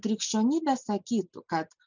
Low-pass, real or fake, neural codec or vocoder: 7.2 kHz; real; none